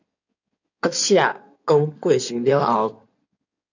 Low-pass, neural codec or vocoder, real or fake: 7.2 kHz; codec, 16 kHz in and 24 kHz out, 2.2 kbps, FireRedTTS-2 codec; fake